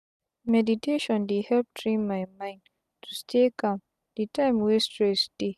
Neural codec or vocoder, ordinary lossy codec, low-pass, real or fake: none; none; 14.4 kHz; real